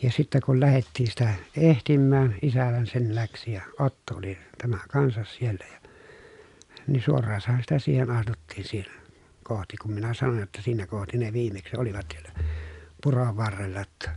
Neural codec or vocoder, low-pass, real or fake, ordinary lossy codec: none; 10.8 kHz; real; none